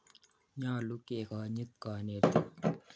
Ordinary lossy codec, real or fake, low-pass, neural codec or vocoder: none; real; none; none